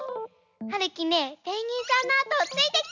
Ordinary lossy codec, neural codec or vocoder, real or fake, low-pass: none; none; real; 7.2 kHz